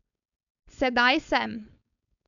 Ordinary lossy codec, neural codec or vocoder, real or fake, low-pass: none; codec, 16 kHz, 4.8 kbps, FACodec; fake; 7.2 kHz